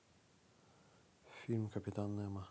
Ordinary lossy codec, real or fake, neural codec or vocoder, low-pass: none; real; none; none